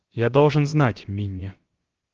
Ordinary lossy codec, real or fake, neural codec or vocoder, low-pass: Opus, 16 kbps; fake; codec, 16 kHz, about 1 kbps, DyCAST, with the encoder's durations; 7.2 kHz